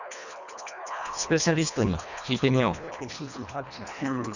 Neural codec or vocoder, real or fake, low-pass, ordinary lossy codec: codec, 24 kHz, 1.5 kbps, HILCodec; fake; 7.2 kHz; none